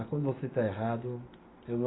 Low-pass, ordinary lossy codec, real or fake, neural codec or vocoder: 7.2 kHz; AAC, 16 kbps; real; none